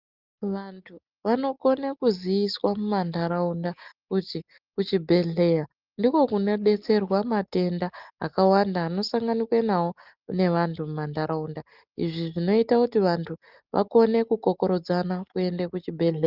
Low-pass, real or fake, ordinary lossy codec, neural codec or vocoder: 5.4 kHz; real; Opus, 24 kbps; none